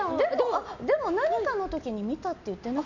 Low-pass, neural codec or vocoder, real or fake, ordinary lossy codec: 7.2 kHz; none; real; none